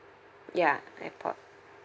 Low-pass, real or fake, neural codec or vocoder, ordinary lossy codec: none; real; none; none